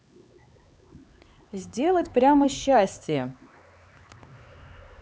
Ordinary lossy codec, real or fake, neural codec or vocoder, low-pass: none; fake; codec, 16 kHz, 4 kbps, X-Codec, HuBERT features, trained on LibriSpeech; none